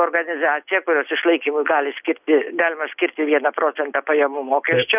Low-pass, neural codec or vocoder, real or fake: 3.6 kHz; none; real